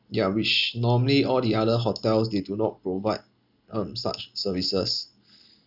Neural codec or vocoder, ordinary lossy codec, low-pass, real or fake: none; none; 5.4 kHz; real